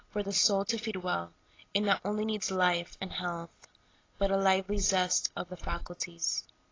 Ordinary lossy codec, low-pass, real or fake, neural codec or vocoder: AAC, 32 kbps; 7.2 kHz; real; none